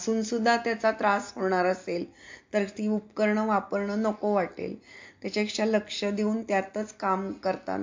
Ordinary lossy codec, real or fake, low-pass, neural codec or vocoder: MP3, 48 kbps; real; 7.2 kHz; none